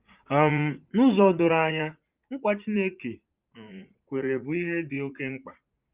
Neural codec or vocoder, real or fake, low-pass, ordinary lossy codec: vocoder, 44.1 kHz, 80 mel bands, Vocos; fake; 3.6 kHz; Opus, 32 kbps